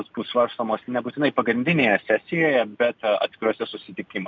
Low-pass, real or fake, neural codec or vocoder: 7.2 kHz; real; none